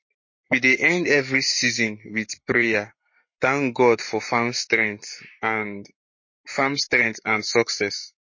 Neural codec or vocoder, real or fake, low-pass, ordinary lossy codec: codec, 44.1 kHz, 7.8 kbps, Pupu-Codec; fake; 7.2 kHz; MP3, 32 kbps